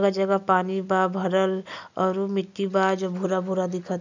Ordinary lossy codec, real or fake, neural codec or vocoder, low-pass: none; real; none; 7.2 kHz